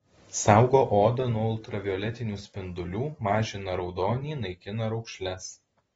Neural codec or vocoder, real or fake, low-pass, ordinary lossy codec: none; real; 19.8 kHz; AAC, 24 kbps